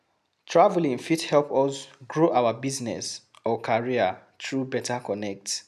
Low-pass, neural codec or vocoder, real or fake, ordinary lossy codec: 14.4 kHz; none; real; none